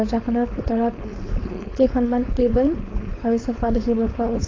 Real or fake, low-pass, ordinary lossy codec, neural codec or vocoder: fake; 7.2 kHz; AAC, 32 kbps; codec, 16 kHz, 4.8 kbps, FACodec